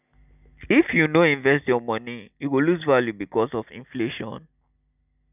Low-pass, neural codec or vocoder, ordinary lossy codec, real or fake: 3.6 kHz; none; none; real